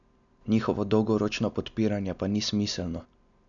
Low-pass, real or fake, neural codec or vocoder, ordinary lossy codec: 7.2 kHz; real; none; none